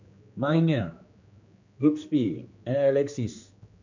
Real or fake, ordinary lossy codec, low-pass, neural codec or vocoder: fake; MP3, 64 kbps; 7.2 kHz; codec, 16 kHz, 2 kbps, X-Codec, HuBERT features, trained on general audio